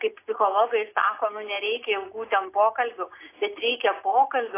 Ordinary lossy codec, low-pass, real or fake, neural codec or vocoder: AAC, 24 kbps; 3.6 kHz; real; none